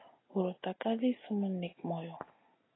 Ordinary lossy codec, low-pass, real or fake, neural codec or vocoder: AAC, 16 kbps; 7.2 kHz; real; none